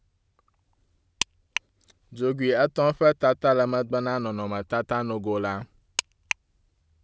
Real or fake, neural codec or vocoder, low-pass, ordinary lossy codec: real; none; none; none